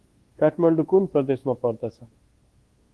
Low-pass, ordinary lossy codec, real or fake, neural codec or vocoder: 10.8 kHz; Opus, 16 kbps; fake; codec, 24 kHz, 1.2 kbps, DualCodec